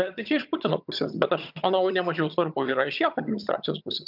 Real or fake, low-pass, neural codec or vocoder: fake; 5.4 kHz; vocoder, 22.05 kHz, 80 mel bands, HiFi-GAN